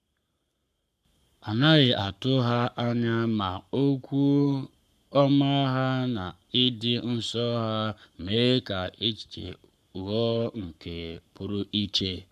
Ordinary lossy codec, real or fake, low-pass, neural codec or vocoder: none; fake; 14.4 kHz; codec, 44.1 kHz, 7.8 kbps, Pupu-Codec